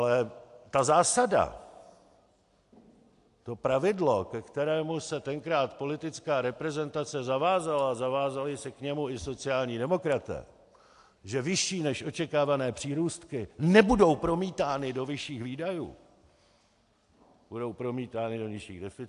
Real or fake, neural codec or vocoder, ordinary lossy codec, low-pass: real; none; AAC, 64 kbps; 10.8 kHz